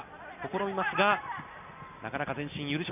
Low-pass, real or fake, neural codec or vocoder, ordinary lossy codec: 3.6 kHz; real; none; none